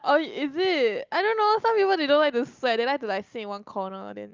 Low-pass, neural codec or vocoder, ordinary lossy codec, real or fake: 7.2 kHz; none; Opus, 24 kbps; real